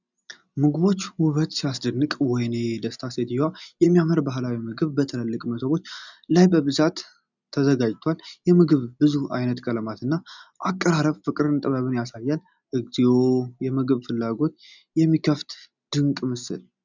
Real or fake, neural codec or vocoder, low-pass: real; none; 7.2 kHz